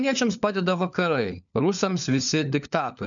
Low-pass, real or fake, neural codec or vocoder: 7.2 kHz; fake; codec, 16 kHz, 4 kbps, FunCodec, trained on LibriTTS, 50 frames a second